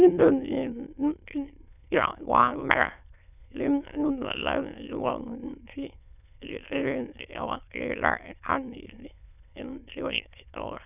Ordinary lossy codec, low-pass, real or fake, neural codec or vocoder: none; 3.6 kHz; fake; autoencoder, 22.05 kHz, a latent of 192 numbers a frame, VITS, trained on many speakers